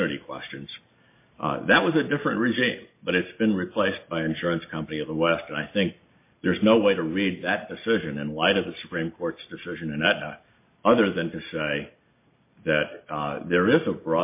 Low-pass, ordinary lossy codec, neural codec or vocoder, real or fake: 3.6 kHz; MP3, 24 kbps; none; real